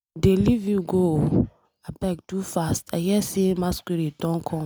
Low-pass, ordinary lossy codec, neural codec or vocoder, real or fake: none; none; none; real